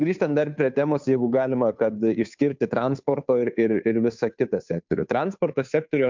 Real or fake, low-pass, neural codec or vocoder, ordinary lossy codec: fake; 7.2 kHz; codec, 16 kHz, 2 kbps, FunCodec, trained on Chinese and English, 25 frames a second; MP3, 64 kbps